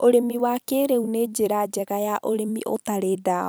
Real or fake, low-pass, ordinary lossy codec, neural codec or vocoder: fake; none; none; vocoder, 44.1 kHz, 128 mel bands every 256 samples, BigVGAN v2